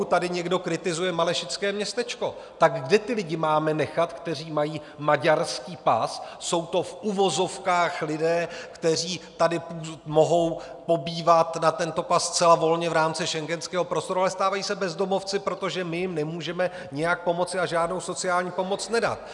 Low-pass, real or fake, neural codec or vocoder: 10.8 kHz; real; none